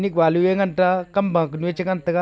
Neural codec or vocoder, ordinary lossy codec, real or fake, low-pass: none; none; real; none